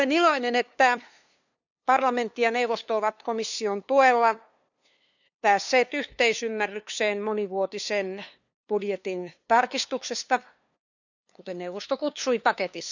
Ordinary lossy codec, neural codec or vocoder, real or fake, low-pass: none; codec, 16 kHz, 2 kbps, FunCodec, trained on LibriTTS, 25 frames a second; fake; 7.2 kHz